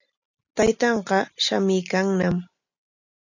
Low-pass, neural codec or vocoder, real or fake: 7.2 kHz; none; real